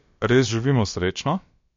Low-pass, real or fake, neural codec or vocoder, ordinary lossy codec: 7.2 kHz; fake; codec, 16 kHz, about 1 kbps, DyCAST, with the encoder's durations; MP3, 48 kbps